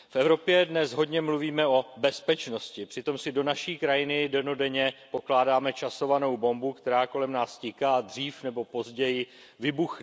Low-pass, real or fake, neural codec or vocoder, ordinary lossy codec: none; real; none; none